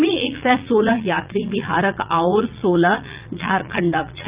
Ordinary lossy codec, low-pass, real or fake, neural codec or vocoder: Opus, 24 kbps; 3.6 kHz; fake; vocoder, 44.1 kHz, 80 mel bands, Vocos